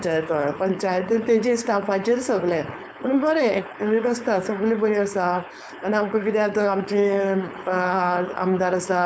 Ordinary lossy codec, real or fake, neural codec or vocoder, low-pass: none; fake; codec, 16 kHz, 4.8 kbps, FACodec; none